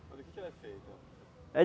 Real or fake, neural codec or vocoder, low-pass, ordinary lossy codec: real; none; none; none